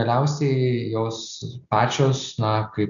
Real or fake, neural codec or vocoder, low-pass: real; none; 7.2 kHz